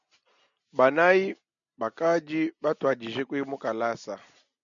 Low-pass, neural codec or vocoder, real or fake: 7.2 kHz; none; real